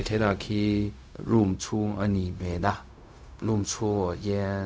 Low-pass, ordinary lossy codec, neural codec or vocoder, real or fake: none; none; codec, 16 kHz, 0.4 kbps, LongCat-Audio-Codec; fake